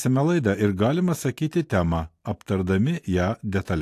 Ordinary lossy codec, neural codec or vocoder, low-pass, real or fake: AAC, 64 kbps; none; 14.4 kHz; real